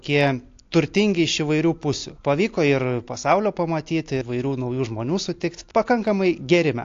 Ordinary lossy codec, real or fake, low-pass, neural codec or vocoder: AAC, 48 kbps; real; 7.2 kHz; none